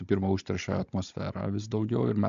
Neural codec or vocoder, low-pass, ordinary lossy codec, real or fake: codec, 16 kHz, 16 kbps, FunCodec, trained on Chinese and English, 50 frames a second; 7.2 kHz; MP3, 64 kbps; fake